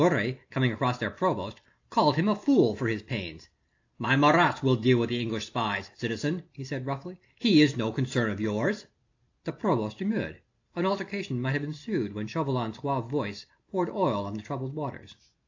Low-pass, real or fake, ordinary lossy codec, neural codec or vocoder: 7.2 kHz; real; AAC, 48 kbps; none